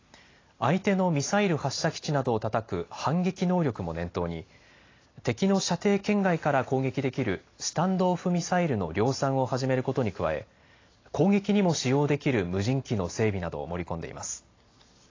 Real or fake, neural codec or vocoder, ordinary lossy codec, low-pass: real; none; AAC, 32 kbps; 7.2 kHz